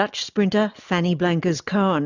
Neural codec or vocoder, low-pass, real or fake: none; 7.2 kHz; real